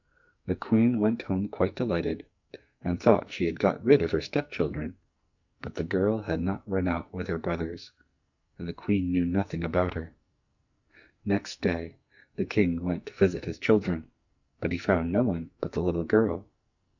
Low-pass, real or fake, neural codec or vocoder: 7.2 kHz; fake; codec, 44.1 kHz, 2.6 kbps, SNAC